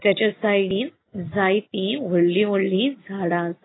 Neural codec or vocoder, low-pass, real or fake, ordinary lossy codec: none; 7.2 kHz; real; AAC, 16 kbps